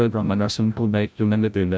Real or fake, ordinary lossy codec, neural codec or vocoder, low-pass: fake; none; codec, 16 kHz, 0.5 kbps, FreqCodec, larger model; none